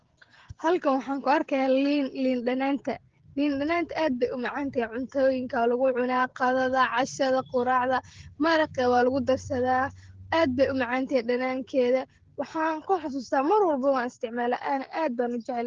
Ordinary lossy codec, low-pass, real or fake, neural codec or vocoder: Opus, 16 kbps; 7.2 kHz; fake; codec, 16 kHz, 16 kbps, FreqCodec, smaller model